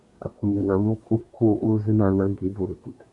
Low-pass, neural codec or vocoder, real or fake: 10.8 kHz; codec, 24 kHz, 1 kbps, SNAC; fake